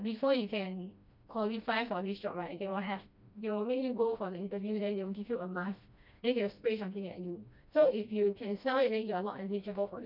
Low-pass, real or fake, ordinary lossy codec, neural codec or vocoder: 5.4 kHz; fake; none; codec, 16 kHz, 1 kbps, FreqCodec, smaller model